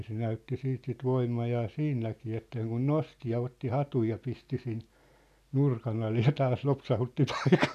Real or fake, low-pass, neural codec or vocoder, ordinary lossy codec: real; 14.4 kHz; none; none